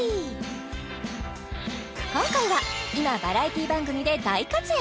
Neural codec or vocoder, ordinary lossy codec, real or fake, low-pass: none; none; real; none